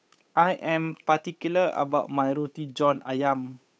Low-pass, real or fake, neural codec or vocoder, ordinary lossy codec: none; fake; codec, 16 kHz, 8 kbps, FunCodec, trained on Chinese and English, 25 frames a second; none